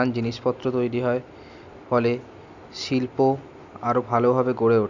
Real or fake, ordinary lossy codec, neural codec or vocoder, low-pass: real; none; none; 7.2 kHz